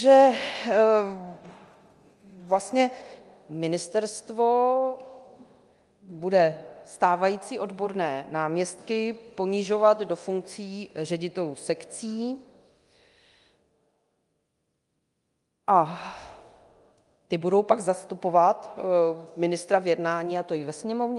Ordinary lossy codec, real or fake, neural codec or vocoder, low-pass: Opus, 32 kbps; fake; codec, 24 kHz, 0.9 kbps, DualCodec; 10.8 kHz